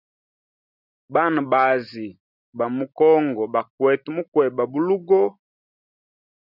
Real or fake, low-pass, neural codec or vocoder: real; 5.4 kHz; none